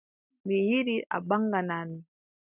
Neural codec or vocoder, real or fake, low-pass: none; real; 3.6 kHz